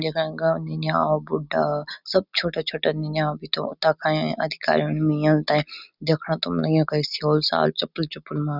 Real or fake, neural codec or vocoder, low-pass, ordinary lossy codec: real; none; 5.4 kHz; none